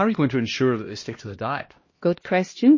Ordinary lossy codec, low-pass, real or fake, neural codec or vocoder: MP3, 32 kbps; 7.2 kHz; fake; codec, 16 kHz, 1 kbps, X-Codec, HuBERT features, trained on LibriSpeech